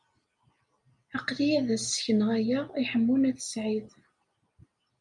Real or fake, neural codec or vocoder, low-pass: real; none; 10.8 kHz